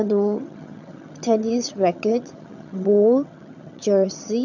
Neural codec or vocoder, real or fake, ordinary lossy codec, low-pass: vocoder, 22.05 kHz, 80 mel bands, HiFi-GAN; fake; none; 7.2 kHz